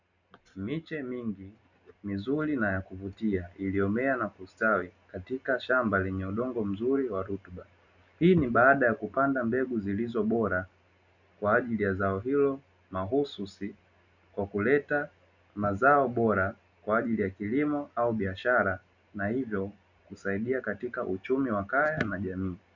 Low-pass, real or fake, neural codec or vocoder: 7.2 kHz; real; none